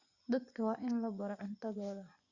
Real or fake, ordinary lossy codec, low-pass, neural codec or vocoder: fake; Opus, 64 kbps; 7.2 kHz; vocoder, 24 kHz, 100 mel bands, Vocos